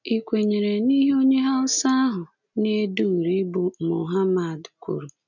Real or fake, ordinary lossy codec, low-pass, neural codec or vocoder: real; none; 7.2 kHz; none